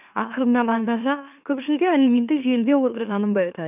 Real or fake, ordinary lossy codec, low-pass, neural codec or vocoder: fake; none; 3.6 kHz; autoencoder, 44.1 kHz, a latent of 192 numbers a frame, MeloTTS